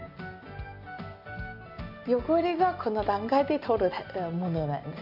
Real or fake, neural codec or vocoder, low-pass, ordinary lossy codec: real; none; 5.4 kHz; AAC, 48 kbps